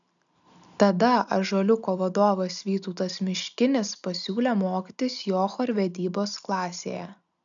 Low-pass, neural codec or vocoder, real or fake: 7.2 kHz; none; real